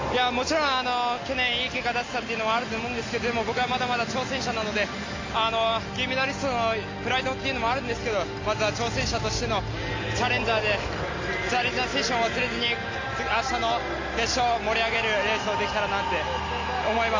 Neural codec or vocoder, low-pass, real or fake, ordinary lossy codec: none; 7.2 kHz; real; AAC, 32 kbps